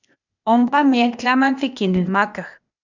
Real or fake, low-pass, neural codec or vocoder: fake; 7.2 kHz; codec, 16 kHz, 0.8 kbps, ZipCodec